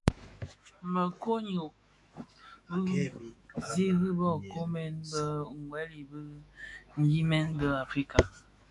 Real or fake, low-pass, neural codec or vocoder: fake; 10.8 kHz; autoencoder, 48 kHz, 128 numbers a frame, DAC-VAE, trained on Japanese speech